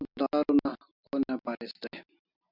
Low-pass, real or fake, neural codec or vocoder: 5.4 kHz; real; none